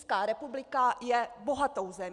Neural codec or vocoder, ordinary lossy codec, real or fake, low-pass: none; Opus, 64 kbps; real; 10.8 kHz